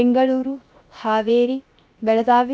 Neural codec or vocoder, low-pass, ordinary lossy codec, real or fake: codec, 16 kHz, 0.7 kbps, FocalCodec; none; none; fake